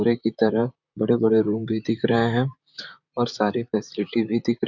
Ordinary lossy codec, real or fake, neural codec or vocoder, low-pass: none; fake; vocoder, 44.1 kHz, 128 mel bands, Pupu-Vocoder; 7.2 kHz